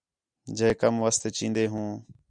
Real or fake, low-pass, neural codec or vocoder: real; 9.9 kHz; none